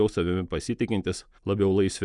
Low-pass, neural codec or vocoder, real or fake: 10.8 kHz; none; real